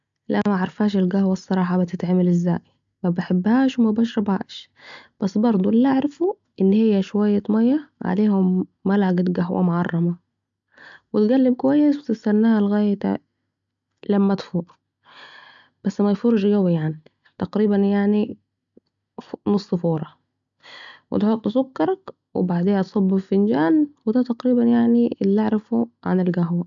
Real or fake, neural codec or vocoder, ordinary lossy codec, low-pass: real; none; MP3, 64 kbps; 7.2 kHz